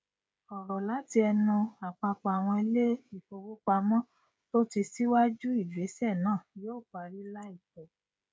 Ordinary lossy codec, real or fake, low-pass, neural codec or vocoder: none; fake; none; codec, 16 kHz, 16 kbps, FreqCodec, smaller model